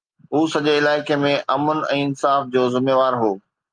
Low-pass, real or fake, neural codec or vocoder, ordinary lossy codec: 9.9 kHz; real; none; Opus, 32 kbps